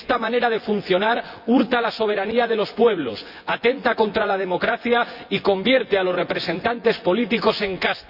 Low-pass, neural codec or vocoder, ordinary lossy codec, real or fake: 5.4 kHz; vocoder, 24 kHz, 100 mel bands, Vocos; Opus, 64 kbps; fake